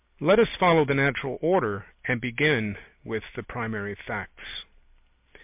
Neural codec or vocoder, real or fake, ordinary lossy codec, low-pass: none; real; MP3, 32 kbps; 3.6 kHz